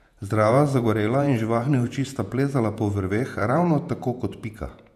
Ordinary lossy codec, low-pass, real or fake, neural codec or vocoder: MP3, 96 kbps; 14.4 kHz; fake; vocoder, 48 kHz, 128 mel bands, Vocos